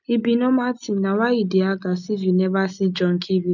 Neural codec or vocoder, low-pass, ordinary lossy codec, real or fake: none; 7.2 kHz; none; real